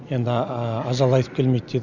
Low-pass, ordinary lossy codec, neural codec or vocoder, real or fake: 7.2 kHz; none; none; real